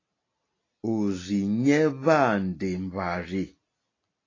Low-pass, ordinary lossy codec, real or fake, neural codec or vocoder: 7.2 kHz; AAC, 32 kbps; real; none